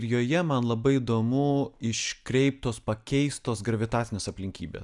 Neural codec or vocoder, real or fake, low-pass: none; real; 10.8 kHz